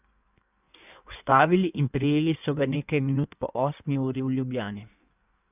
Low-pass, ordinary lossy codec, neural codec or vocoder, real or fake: 3.6 kHz; none; codec, 16 kHz in and 24 kHz out, 1.1 kbps, FireRedTTS-2 codec; fake